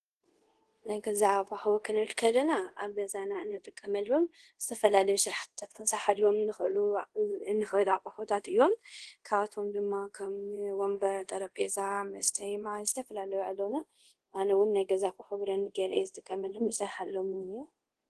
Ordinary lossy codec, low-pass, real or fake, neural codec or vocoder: Opus, 16 kbps; 10.8 kHz; fake; codec, 24 kHz, 0.5 kbps, DualCodec